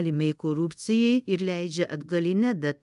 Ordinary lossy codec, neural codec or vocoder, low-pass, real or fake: AAC, 96 kbps; codec, 24 kHz, 0.5 kbps, DualCodec; 10.8 kHz; fake